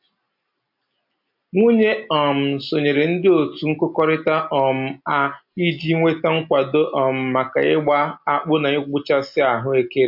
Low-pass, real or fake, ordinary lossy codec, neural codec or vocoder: 5.4 kHz; real; MP3, 48 kbps; none